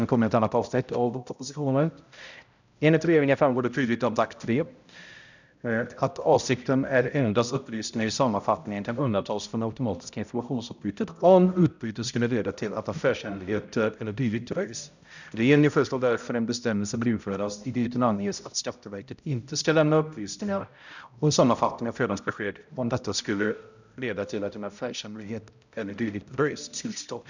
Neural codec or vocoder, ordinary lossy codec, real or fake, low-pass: codec, 16 kHz, 0.5 kbps, X-Codec, HuBERT features, trained on balanced general audio; none; fake; 7.2 kHz